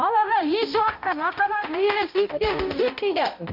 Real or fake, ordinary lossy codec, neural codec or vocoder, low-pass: fake; none; codec, 16 kHz, 0.5 kbps, X-Codec, HuBERT features, trained on balanced general audio; 5.4 kHz